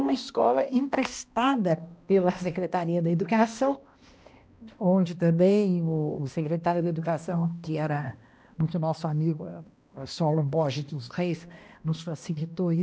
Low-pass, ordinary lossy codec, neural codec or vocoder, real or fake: none; none; codec, 16 kHz, 1 kbps, X-Codec, HuBERT features, trained on balanced general audio; fake